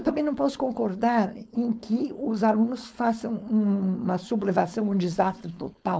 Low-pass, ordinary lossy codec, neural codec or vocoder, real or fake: none; none; codec, 16 kHz, 4.8 kbps, FACodec; fake